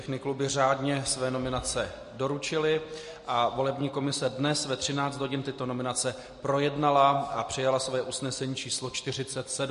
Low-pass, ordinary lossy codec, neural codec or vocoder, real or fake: 10.8 kHz; MP3, 48 kbps; none; real